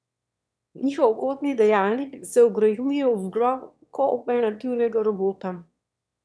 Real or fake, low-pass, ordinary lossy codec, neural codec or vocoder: fake; none; none; autoencoder, 22.05 kHz, a latent of 192 numbers a frame, VITS, trained on one speaker